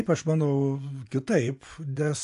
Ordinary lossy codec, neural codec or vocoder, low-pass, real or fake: AAC, 64 kbps; vocoder, 24 kHz, 100 mel bands, Vocos; 10.8 kHz; fake